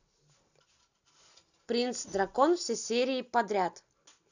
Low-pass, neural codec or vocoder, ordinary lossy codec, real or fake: 7.2 kHz; none; none; real